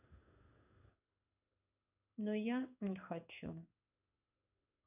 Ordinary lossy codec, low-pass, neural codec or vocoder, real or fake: none; 3.6 kHz; codec, 16 kHz in and 24 kHz out, 1 kbps, XY-Tokenizer; fake